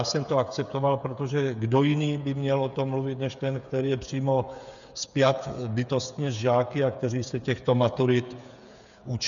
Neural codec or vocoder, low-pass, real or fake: codec, 16 kHz, 8 kbps, FreqCodec, smaller model; 7.2 kHz; fake